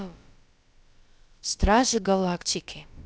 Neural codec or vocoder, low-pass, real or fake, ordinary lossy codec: codec, 16 kHz, about 1 kbps, DyCAST, with the encoder's durations; none; fake; none